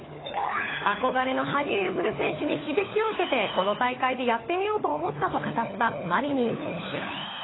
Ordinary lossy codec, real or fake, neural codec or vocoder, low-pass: AAC, 16 kbps; fake; codec, 16 kHz, 4 kbps, FunCodec, trained on LibriTTS, 50 frames a second; 7.2 kHz